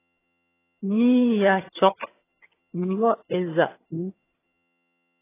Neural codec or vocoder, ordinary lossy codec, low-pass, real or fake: vocoder, 22.05 kHz, 80 mel bands, HiFi-GAN; AAC, 16 kbps; 3.6 kHz; fake